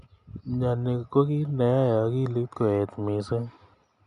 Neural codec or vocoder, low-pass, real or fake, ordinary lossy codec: none; 10.8 kHz; real; none